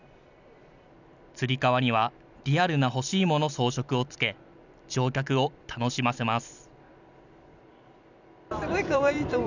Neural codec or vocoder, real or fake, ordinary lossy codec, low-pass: vocoder, 44.1 kHz, 128 mel bands every 256 samples, BigVGAN v2; fake; none; 7.2 kHz